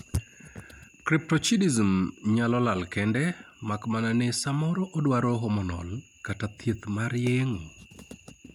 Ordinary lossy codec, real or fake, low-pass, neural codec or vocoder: none; real; 19.8 kHz; none